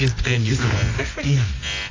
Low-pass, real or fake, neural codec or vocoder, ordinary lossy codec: 7.2 kHz; fake; codec, 24 kHz, 0.9 kbps, WavTokenizer, medium music audio release; AAC, 32 kbps